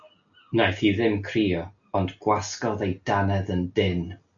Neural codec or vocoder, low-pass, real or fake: none; 7.2 kHz; real